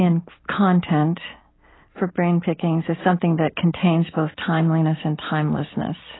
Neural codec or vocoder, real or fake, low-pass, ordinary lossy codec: none; real; 7.2 kHz; AAC, 16 kbps